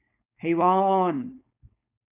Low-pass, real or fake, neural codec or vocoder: 3.6 kHz; fake; codec, 16 kHz, 4.8 kbps, FACodec